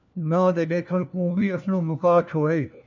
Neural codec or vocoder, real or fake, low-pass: codec, 16 kHz, 1 kbps, FunCodec, trained on LibriTTS, 50 frames a second; fake; 7.2 kHz